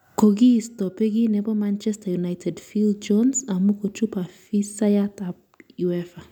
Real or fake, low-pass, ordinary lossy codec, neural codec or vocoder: real; 19.8 kHz; none; none